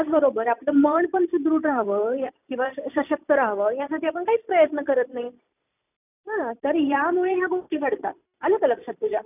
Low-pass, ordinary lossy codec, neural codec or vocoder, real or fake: 3.6 kHz; none; vocoder, 44.1 kHz, 128 mel bands every 256 samples, BigVGAN v2; fake